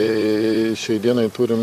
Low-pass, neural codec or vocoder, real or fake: 14.4 kHz; vocoder, 44.1 kHz, 128 mel bands, Pupu-Vocoder; fake